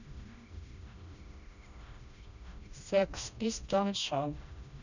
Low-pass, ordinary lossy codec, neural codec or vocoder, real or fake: 7.2 kHz; none; codec, 16 kHz, 1 kbps, FreqCodec, smaller model; fake